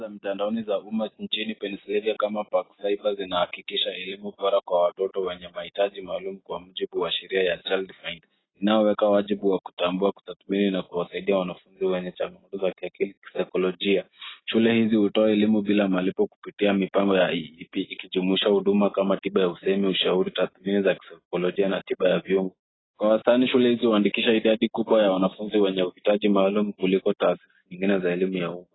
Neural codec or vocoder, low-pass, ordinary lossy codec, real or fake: none; 7.2 kHz; AAC, 16 kbps; real